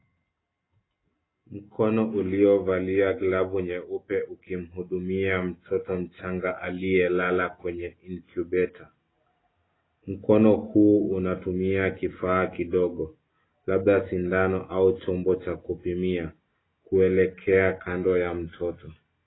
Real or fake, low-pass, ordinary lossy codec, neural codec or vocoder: real; 7.2 kHz; AAC, 16 kbps; none